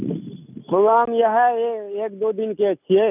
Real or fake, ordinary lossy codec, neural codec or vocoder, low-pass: real; none; none; 3.6 kHz